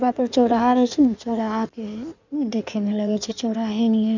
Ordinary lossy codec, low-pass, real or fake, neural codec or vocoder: none; 7.2 kHz; fake; codec, 16 kHz in and 24 kHz out, 1.1 kbps, FireRedTTS-2 codec